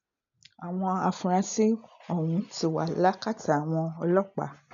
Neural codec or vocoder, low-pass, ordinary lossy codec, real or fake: none; 7.2 kHz; none; real